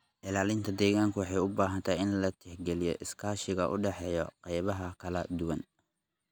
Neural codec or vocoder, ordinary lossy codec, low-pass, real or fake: none; none; none; real